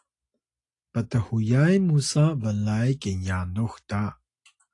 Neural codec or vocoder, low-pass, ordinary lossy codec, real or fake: none; 10.8 kHz; AAC, 48 kbps; real